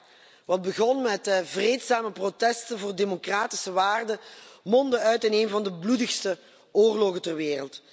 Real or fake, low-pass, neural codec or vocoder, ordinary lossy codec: real; none; none; none